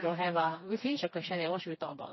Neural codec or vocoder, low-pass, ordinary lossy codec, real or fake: codec, 16 kHz, 2 kbps, FreqCodec, smaller model; 7.2 kHz; MP3, 24 kbps; fake